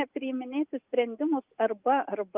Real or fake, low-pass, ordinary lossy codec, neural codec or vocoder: real; 3.6 kHz; Opus, 24 kbps; none